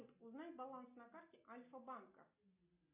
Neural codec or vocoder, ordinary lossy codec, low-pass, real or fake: none; MP3, 32 kbps; 3.6 kHz; real